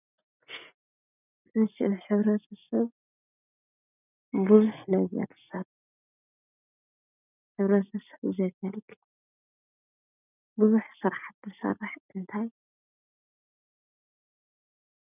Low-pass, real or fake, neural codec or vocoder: 3.6 kHz; real; none